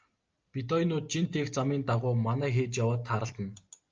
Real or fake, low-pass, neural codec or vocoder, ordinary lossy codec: real; 7.2 kHz; none; Opus, 24 kbps